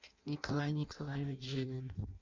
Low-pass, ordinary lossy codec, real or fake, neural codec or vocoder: 7.2 kHz; MP3, 64 kbps; fake; codec, 16 kHz in and 24 kHz out, 0.6 kbps, FireRedTTS-2 codec